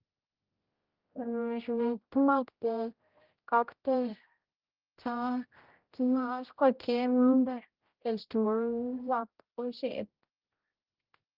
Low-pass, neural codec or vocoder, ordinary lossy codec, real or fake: 5.4 kHz; codec, 16 kHz, 0.5 kbps, X-Codec, HuBERT features, trained on general audio; Opus, 32 kbps; fake